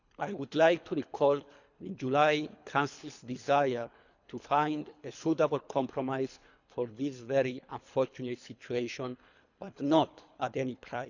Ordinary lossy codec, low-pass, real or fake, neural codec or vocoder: none; 7.2 kHz; fake; codec, 24 kHz, 3 kbps, HILCodec